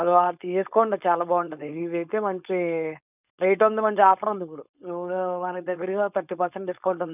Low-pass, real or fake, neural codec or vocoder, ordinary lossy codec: 3.6 kHz; fake; codec, 16 kHz, 4.8 kbps, FACodec; none